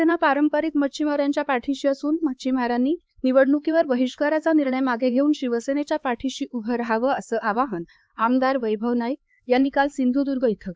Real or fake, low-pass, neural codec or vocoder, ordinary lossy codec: fake; none; codec, 16 kHz, 4 kbps, X-Codec, HuBERT features, trained on LibriSpeech; none